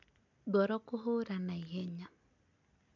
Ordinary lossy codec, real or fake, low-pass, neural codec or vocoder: MP3, 64 kbps; real; 7.2 kHz; none